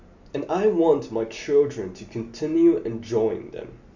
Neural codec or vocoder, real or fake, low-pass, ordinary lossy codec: none; real; 7.2 kHz; none